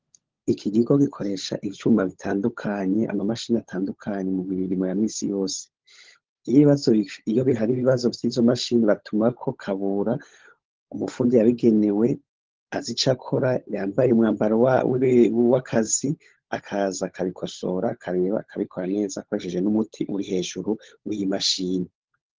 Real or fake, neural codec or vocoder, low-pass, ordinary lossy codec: fake; codec, 16 kHz, 16 kbps, FunCodec, trained on LibriTTS, 50 frames a second; 7.2 kHz; Opus, 16 kbps